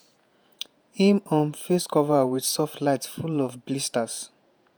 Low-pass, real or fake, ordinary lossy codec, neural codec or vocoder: none; fake; none; vocoder, 48 kHz, 128 mel bands, Vocos